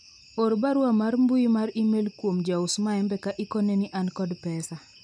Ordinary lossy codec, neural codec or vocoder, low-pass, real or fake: none; none; 9.9 kHz; real